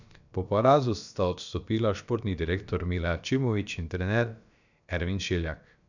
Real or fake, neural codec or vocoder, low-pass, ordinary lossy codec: fake; codec, 16 kHz, about 1 kbps, DyCAST, with the encoder's durations; 7.2 kHz; none